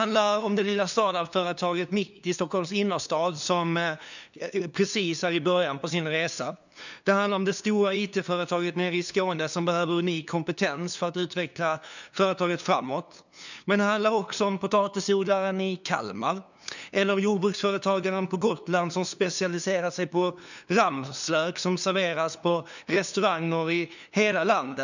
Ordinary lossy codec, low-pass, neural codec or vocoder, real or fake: none; 7.2 kHz; codec, 16 kHz, 2 kbps, FunCodec, trained on LibriTTS, 25 frames a second; fake